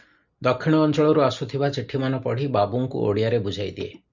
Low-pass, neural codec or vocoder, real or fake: 7.2 kHz; none; real